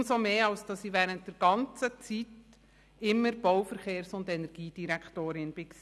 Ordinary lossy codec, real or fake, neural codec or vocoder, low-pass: none; real; none; none